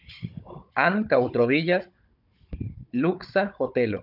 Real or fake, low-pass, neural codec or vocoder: fake; 5.4 kHz; codec, 16 kHz, 16 kbps, FunCodec, trained on Chinese and English, 50 frames a second